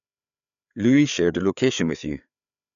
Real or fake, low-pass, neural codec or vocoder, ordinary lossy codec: fake; 7.2 kHz; codec, 16 kHz, 4 kbps, FreqCodec, larger model; none